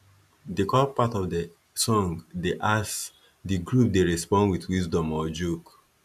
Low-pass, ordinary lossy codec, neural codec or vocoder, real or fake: 14.4 kHz; none; none; real